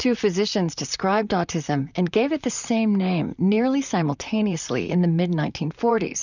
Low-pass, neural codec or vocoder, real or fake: 7.2 kHz; vocoder, 44.1 kHz, 128 mel bands, Pupu-Vocoder; fake